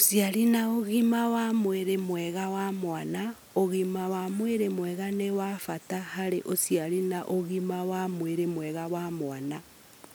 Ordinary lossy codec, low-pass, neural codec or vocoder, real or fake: none; none; none; real